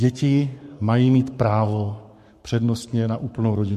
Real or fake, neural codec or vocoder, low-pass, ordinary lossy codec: fake; codec, 44.1 kHz, 7.8 kbps, Pupu-Codec; 14.4 kHz; MP3, 64 kbps